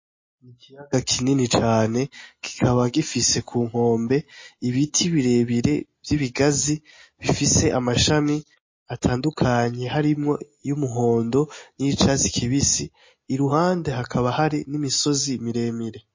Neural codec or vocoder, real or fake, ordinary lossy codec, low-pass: none; real; MP3, 32 kbps; 7.2 kHz